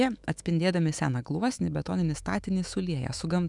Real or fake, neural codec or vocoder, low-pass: fake; autoencoder, 48 kHz, 128 numbers a frame, DAC-VAE, trained on Japanese speech; 10.8 kHz